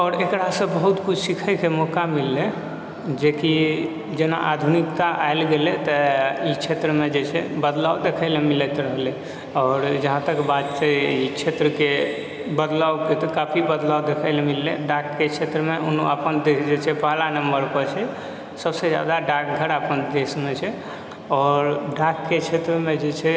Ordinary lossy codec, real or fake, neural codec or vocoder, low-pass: none; real; none; none